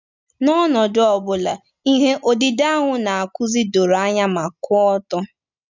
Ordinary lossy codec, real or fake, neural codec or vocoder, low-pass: none; real; none; 7.2 kHz